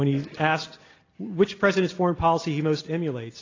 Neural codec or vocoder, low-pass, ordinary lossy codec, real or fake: none; 7.2 kHz; AAC, 32 kbps; real